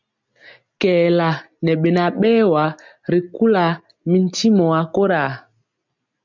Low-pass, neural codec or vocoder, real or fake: 7.2 kHz; none; real